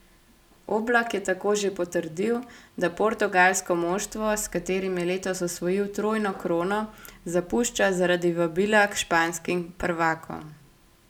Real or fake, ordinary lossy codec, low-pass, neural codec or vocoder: real; none; 19.8 kHz; none